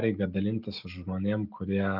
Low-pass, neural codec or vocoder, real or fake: 5.4 kHz; none; real